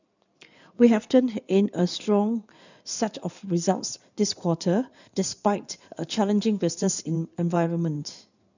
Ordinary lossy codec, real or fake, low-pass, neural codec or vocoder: none; fake; 7.2 kHz; codec, 16 kHz in and 24 kHz out, 2.2 kbps, FireRedTTS-2 codec